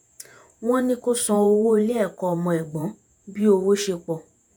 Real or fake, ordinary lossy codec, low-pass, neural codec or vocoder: fake; none; none; vocoder, 48 kHz, 128 mel bands, Vocos